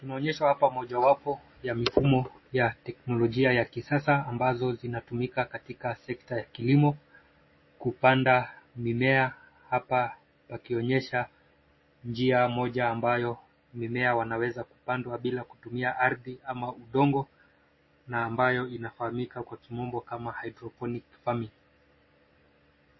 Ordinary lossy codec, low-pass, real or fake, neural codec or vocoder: MP3, 24 kbps; 7.2 kHz; real; none